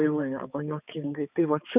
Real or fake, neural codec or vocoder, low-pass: fake; codec, 16 kHz, 4 kbps, X-Codec, HuBERT features, trained on balanced general audio; 3.6 kHz